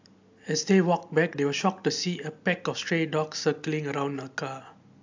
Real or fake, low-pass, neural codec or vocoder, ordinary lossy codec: real; 7.2 kHz; none; AAC, 48 kbps